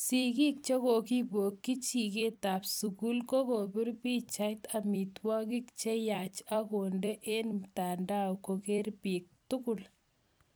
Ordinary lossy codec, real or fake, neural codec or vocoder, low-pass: none; fake; vocoder, 44.1 kHz, 128 mel bands every 256 samples, BigVGAN v2; none